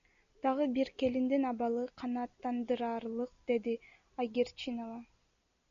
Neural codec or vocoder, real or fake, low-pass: none; real; 7.2 kHz